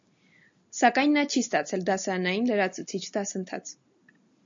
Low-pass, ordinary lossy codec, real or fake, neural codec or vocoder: 7.2 kHz; AAC, 64 kbps; real; none